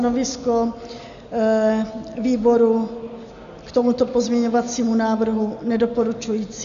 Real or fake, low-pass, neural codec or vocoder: real; 7.2 kHz; none